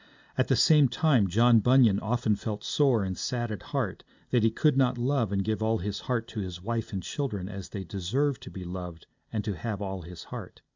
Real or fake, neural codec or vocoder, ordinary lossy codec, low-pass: real; none; MP3, 64 kbps; 7.2 kHz